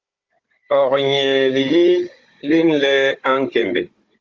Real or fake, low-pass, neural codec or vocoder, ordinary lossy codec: fake; 7.2 kHz; codec, 16 kHz, 16 kbps, FunCodec, trained on Chinese and English, 50 frames a second; Opus, 32 kbps